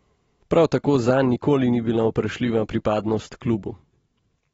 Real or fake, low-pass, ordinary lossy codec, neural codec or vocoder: real; 19.8 kHz; AAC, 24 kbps; none